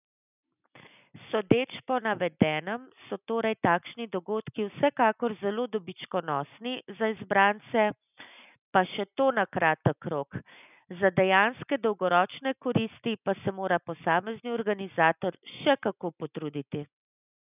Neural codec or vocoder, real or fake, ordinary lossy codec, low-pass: none; real; none; 3.6 kHz